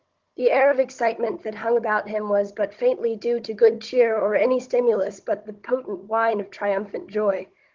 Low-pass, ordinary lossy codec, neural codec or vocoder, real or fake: 7.2 kHz; Opus, 16 kbps; codec, 16 kHz, 16 kbps, FunCodec, trained on Chinese and English, 50 frames a second; fake